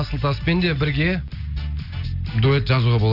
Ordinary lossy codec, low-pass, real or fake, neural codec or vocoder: none; 5.4 kHz; real; none